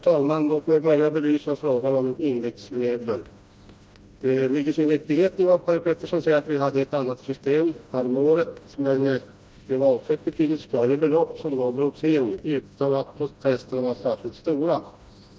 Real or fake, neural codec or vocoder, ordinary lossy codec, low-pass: fake; codec, 16 kHz, 1 kbps, FreqCodec, smaller model; none; none